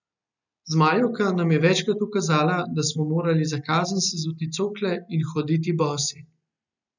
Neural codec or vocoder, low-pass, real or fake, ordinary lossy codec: none; 7.2 kHz; real; none